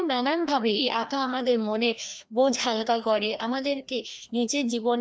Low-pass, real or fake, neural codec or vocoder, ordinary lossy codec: none; fake; codec, 16 kHz, 1 kbps, FreqCodec, larger model; none